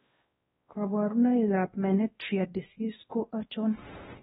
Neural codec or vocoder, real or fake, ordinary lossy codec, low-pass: codec, 16 kHz, 1 kbps, X-Codec, WavLM features, trained on Multilingual LibriSpeech; fake; AAC, 16 kbps; 7.2 kHz